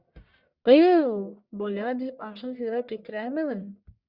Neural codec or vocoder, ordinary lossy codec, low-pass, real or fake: codec, 44.1 kHz, 1.7 kbps, Pupu-Codec; Opus, 64 kbps; 5.4 kHz; fake